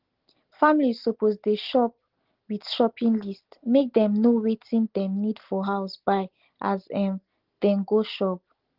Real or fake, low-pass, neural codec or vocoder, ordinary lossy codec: real; 5.4 kHz; none; Opus, 16 kbps